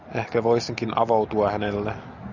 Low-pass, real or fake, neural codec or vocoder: 7.2 kHz; real; none